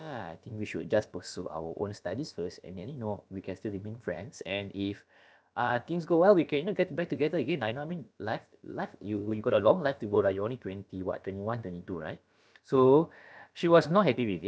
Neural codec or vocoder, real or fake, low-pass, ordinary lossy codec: codec, 16 kHz, about 1 kbps, DyCAST, with the encoder's durations; fake; none; none